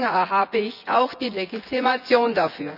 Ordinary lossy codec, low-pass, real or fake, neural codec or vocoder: none; 5.4 kHz; fake; vocoder, 24 kHz, 100 mel bands, Vocos